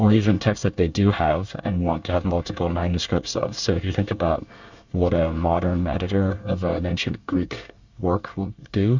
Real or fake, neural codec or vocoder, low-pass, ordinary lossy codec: fake; codec, 24 kHz, 1 kbps, SNAC; 7.2 kHz; Opus, 64 kbps